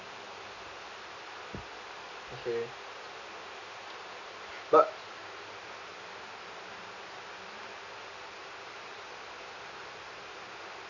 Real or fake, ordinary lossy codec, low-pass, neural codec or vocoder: real; none; 7.2 kHz; none